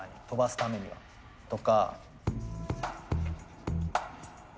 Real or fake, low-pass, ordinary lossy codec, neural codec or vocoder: real; none; none; none